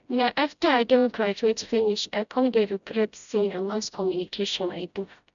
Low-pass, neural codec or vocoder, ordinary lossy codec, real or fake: 7.2 kHz; codec, 16 kHz, 0.5 kbps, FreqCodec, smaller model; none; fake